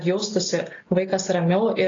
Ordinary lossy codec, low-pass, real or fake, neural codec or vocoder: AAC, 32 kbps; 7.2 kHz; real; none